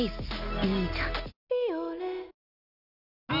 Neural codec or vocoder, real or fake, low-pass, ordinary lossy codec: none; real; 5.4 kHz; none